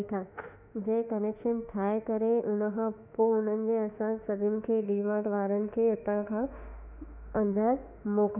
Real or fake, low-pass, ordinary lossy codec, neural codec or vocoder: fake; 3.6 kHz; none; autoencoder, 48 kHz, 32 numbers a frame, DAC-VAE, trained on Japanese speech